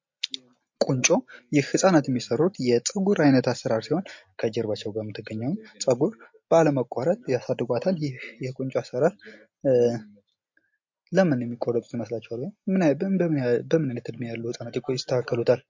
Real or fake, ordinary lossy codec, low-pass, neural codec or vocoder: real; MP3, 48 kbps; 7.2 kHz; none